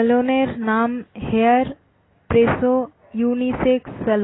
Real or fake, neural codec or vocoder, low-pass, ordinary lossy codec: real; none; 7.2 kHz; AAC, 16 kbps